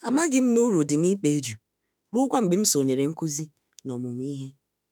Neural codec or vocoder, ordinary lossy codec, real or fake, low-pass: autoencoder, 48 kHz, 32 numbers a frame, DAC-VAE, trained on Japanese speech; none; fake; none